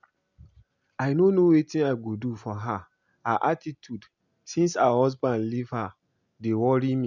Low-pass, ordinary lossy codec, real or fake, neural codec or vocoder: 7.2 kHz; none; real; none